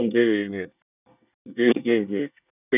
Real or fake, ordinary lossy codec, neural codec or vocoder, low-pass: fake; none; codec, 24 kHz, 1 kbps, SNAC; 3.6 kHz